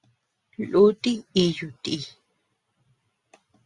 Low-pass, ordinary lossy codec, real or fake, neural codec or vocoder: 10.8 kHz; Opus, 64 kbps; real; none